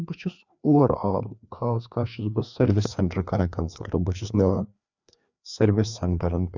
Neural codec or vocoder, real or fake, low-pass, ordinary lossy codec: codec, 16 kHz, 2 kbps, FreqCodec, larger model; fake; 7.2 kHz; none